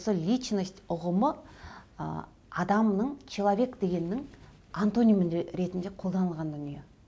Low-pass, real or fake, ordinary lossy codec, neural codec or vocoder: none; real; none; none